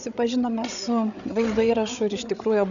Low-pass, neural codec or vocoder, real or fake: 7.2 kHz; codec, 16 kHz, 8 kbps, FreqCodec, larger model; fake